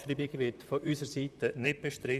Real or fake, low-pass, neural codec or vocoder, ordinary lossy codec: fake; 14.4 kHz; vocoder, 44.1 kHz, 128 mel bands, Pupu-Vocoder; none